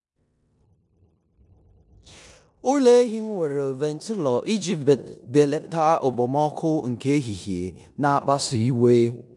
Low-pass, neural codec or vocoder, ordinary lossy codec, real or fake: 10.8 kHz; codec, 16 kHz in and 24 kHz out, 0.9 kbps, LongCat-Audio-Codec, four codebook decoder; none; fake